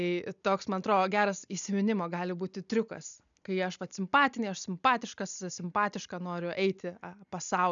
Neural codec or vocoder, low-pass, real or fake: none; 7.2 kHz; real